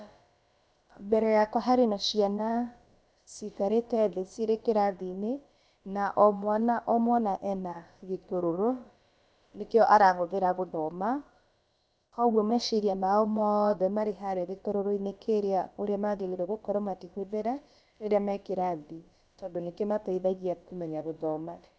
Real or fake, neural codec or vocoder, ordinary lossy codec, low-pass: fake; codec, 16 kHz, about 1 kbps, DyCAST, with the encoder's durations; none; none